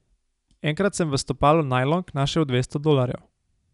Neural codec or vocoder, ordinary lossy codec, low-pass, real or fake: none; none; 10.8 kHz; real